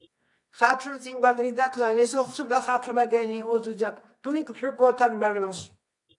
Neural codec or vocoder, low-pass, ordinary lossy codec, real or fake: codec, 24 kHz, 0.9 kbps, WavTokenizer, medium music audio release; 10.8 kHz; AAC, 64 kbps; fake